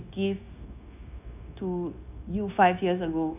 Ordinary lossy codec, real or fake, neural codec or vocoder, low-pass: none; fake; codec, 16 kHz, 0.9 kbps, LongCat-Audio-Codec; 3.6 kHz